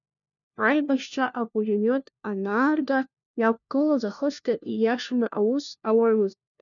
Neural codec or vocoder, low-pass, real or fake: codec, 16 kHz, 1 kbps, FunCodec, trained on LibriTTS, 50 frames a second; 7.2 kHz; fake